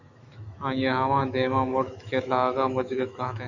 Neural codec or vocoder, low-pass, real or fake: autoencoder, 48 kHz, 128 numbers a frame, DAC-VAE, trained on Japanese speech; 7.2 kHz; fake